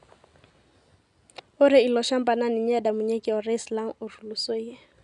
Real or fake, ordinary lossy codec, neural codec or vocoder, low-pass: real; none; none; 10.8 kHz